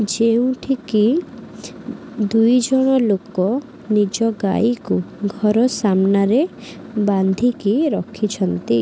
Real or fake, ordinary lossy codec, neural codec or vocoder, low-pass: real; none; none; none